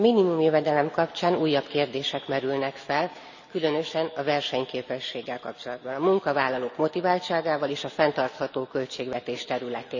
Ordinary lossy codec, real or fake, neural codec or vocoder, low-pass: none; real; none; 7.2 kHz